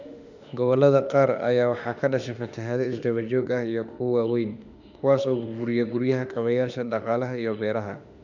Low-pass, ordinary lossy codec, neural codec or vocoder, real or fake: 7.2 kHz; none; autoencoder, 48 kHz, 32 numbers a frame, DAC-VAE, trained on Japanese speech; fake